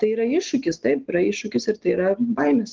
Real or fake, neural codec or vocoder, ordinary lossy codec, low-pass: real; none; Opus, 16 kbps; 7.2 kHz